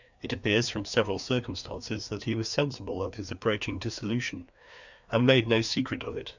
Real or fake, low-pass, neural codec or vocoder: fake; 7.2 kHz; codec, 16 kHz, 2 kbps, FreqCodec, larger model